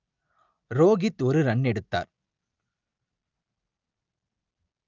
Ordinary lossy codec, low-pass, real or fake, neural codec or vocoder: Opus, 24 kbps; 7.2 kHz; real; none